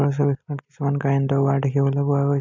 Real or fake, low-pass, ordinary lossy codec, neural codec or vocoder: real; 7.2 kHz; MP3, 64 kbps; none